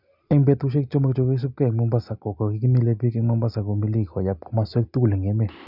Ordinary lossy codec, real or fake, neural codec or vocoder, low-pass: none; real; none; 5.4 kHz